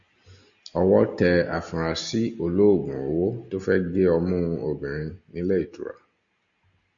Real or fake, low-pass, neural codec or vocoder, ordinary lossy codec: real; 7.2 kHz; none; Opus, 64 kbps